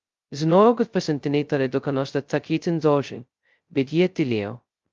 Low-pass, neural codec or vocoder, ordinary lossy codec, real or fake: 7.2 kHz; codec, 16 kHz, 0.2 kbps, FocalCodec; Opus, 32 kbps; fake